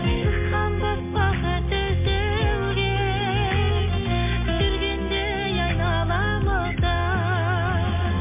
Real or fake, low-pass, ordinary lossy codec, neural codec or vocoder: real; 3.6 kHz; MP3, 24 kbps; none